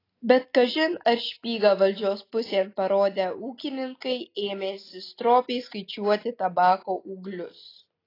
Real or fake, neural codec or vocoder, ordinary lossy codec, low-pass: real; none; AAC, 24 kbps; 5.4 kHz